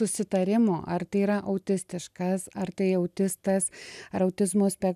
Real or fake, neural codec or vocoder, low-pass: real; none; 14.4 kHz